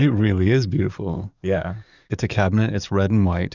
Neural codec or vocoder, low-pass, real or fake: codec, 44.1 kHz, 7.8 kbps, DAC; 7.2 kHz; fake